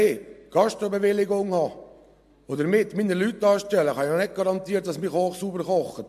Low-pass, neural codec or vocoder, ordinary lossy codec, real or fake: 14.4 kHz; none; MP3, 64 kbps; real